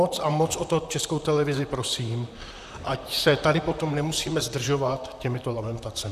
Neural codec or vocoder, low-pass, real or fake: vocoder, 44.1 kHz, 128 mel bands, Pupu-Vocoder; 14.4 kHz; fake